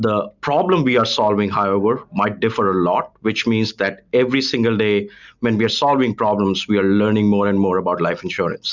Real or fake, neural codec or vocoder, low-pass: real; none; 7.2 kHz